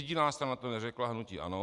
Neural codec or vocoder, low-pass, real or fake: none; 10.8 kHz; real